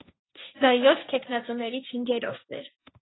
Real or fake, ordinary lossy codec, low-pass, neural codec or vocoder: fake; AAC, 16 kbps; 7.2 kHz; codec, 16 kHz, 1.1 kbps, Voila-Tokenizer